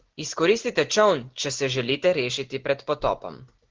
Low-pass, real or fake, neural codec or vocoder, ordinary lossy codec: 7.2 kHz; real; none; Opus, 16 kbps